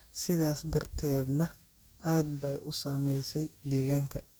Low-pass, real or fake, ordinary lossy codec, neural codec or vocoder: none; fake; none; codec, 44.1 kHz, 2.6 kbps, DAC